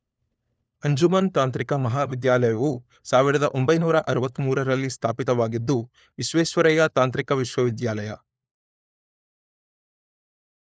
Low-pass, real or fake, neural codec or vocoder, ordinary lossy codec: none; fake; codec, 16 kHz, 4 kbps, FunCodec, trained on LibriTTS, 50 frames a second; none